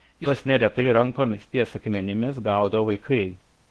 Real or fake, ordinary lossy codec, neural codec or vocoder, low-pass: fake; Opus, 16 kbps; codec, 16 kHz in and 24 kHz out, 0.8 kbps, FocalCodec, streaming, 65536 codes; 10.8 kHz